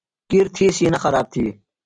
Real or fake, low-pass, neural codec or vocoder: real; 9.9 kHz; none